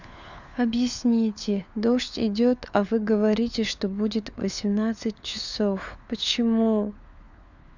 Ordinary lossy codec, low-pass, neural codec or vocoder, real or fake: none; 7.2 kHz; codec, 16 kHz, 4 kbps, FunCodec, trained on LibriTTS, 50 frames a second; fake